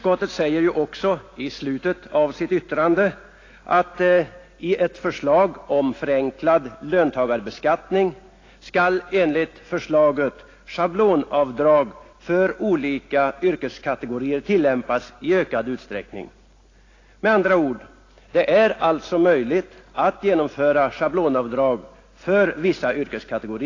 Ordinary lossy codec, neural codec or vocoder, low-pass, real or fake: AAC, 32 kbps; none; 7.2 kHz; real